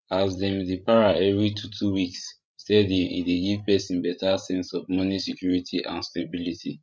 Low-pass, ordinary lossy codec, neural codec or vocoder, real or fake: none; none; codec, 16 kHz, 16 kbps, FreqCodec, larger model; fake